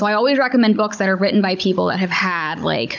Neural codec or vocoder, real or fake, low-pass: codec, 16 kHz, 16 kbps, FunCodec, trained on Chinese and English, 50 frames a second; fake; 7.2 kHz